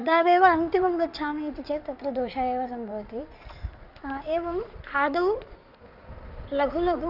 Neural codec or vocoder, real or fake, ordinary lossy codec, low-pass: codec, 16 kHz in and 24 kHz out, 2.2 kbps, FireRedTTS-2 codec; fake; none; 5.4 kHz